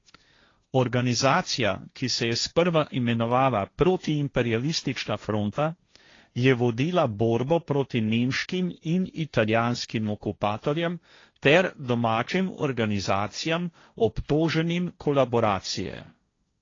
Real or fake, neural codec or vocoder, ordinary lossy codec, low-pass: fake; codec, 16 kHz, 1.1 kbps, Voila-Tokenizer; AAC, 32 kbps; 7.2 kHz